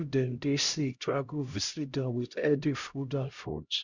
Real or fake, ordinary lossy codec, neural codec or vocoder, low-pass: fake; Opus, 64 kbps; codec, 16 kHz, 0.5 kbps, X-Codec, HuBERT features, trained on LibriSpeech; 7.2 kHz